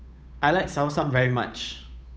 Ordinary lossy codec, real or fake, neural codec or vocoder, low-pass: none; fake; codec, 16 kHz, 8 kbps, FunCodec, trained on Chinese and English, 25 frames a second; none